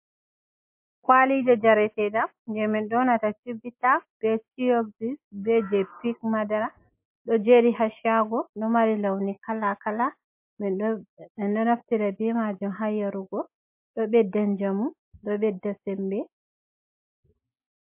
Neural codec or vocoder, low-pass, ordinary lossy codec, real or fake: none; 3.6 kHz; MP3, 32 kbps; real